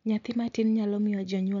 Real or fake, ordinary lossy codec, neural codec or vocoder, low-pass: real; none; none; 7.2 kHz